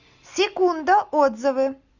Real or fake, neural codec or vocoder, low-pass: real; none; 7.2 kHz